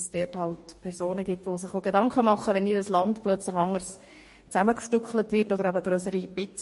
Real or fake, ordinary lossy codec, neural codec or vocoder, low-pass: fake; MP3, 48 kbps; codec, 44.1 kHz, 2.6 kbps, DAC; 14.4 kHz